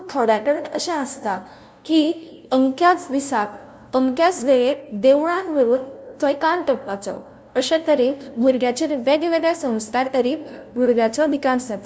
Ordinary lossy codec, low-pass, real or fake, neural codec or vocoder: none; none; fake; codec, 16 kHz, 0.5 kbps, FunCodec, trained on LibriTTS, 25 frames a second